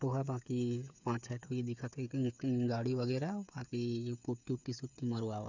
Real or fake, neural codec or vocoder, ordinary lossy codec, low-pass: fake; codec, 16 kHz, 8 kbps, FreqCodec, smaller model; none; 7.2 kHz